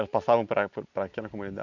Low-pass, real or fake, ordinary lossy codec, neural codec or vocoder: 7.2 kHz; real; none; none